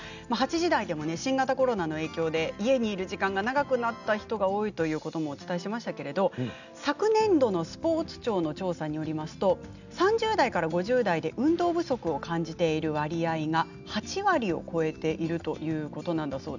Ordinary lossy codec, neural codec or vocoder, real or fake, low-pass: none; none; real; 7.2 kHz